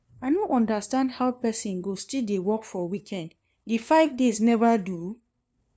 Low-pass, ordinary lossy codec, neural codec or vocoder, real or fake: none; none; codec, 16 kHz, 2 kbps, FunCodec, trained on LibriTTS, 25 frames a second; fake